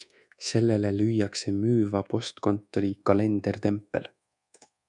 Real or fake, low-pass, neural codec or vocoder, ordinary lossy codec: fake; 10.8 kHz; codec, 24 kHz, 1.2 kbps, DualCodec; MP3, 96 kbps